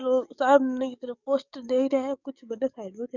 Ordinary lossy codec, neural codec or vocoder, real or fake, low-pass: none; codec, 44.1 kHz, 7.8 kbps, DAC; fake; 7.2 kHz